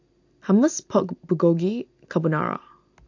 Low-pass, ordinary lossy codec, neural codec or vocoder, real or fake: 7.2 kHz; AAC, 48 kbps; none; real